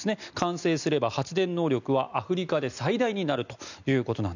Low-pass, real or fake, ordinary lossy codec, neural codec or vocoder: 7.2 kHz; real; none; none